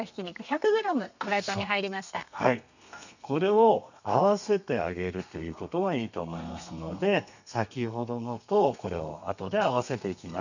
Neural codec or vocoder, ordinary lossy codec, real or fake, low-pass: codec, 32 kHz, 1.9 kbps, SNAC; none; fake; 7.2 kHz